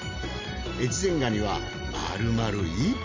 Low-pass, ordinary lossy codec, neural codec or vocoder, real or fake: 7.2 kHz; MP3, 64 kbps; none; real